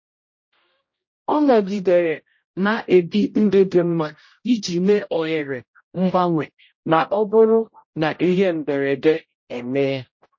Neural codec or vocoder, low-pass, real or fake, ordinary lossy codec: codec, 16 kHz, 0.5 kbps, X-Codec, HuBERT features, trained on general audio; 7.2 kHz; fake; MP3, 32 kbps